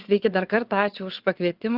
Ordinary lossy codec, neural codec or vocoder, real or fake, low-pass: Opus, 32 kbps; vocoder, 22.05 kHz, 80 mel bands, WaveNeXt; fake; 5.4 kHz